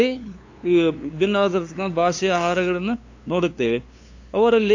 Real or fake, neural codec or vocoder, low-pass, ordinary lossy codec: fake; codec, 16 kHz, 2 kbps, FunCodec, trained on LibriTTS, 25 frames a second; 7.2 kHz; AAC, 48 kbps